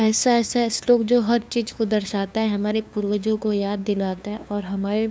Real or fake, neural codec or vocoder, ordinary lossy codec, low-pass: fake; codec, 16 kHz, 2 kbps, FunCodec, trained on LibriTTS, 25 frames a second; none; none